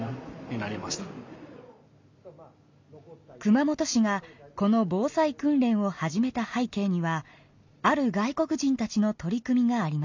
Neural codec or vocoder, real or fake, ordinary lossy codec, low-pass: none; real; MP3, 64 kbps; 7.2 kHz